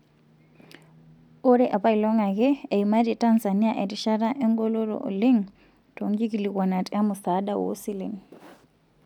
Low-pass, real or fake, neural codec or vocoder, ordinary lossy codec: 19.8 kHz; real; none; none